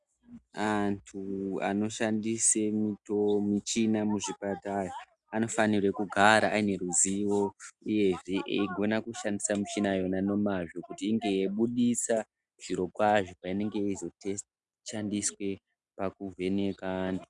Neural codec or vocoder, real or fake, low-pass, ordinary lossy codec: none; real; 10.8 kHz; Opus, 64 kbps